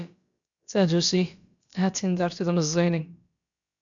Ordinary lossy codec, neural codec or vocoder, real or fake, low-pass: MP3, 96 kbps; codec, 16 kHz, about 1 kbps, DyCAST, with the encoder's durations; fake; 7.2 kHz